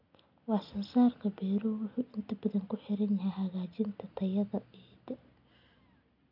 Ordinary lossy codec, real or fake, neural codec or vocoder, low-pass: none; real; none; 5.4 kHz